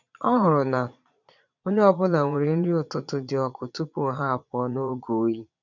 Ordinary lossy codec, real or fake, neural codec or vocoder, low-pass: none; fake; vocoder, 22.05 kHz, 80 mel bands, Vocos; 7.2 kHz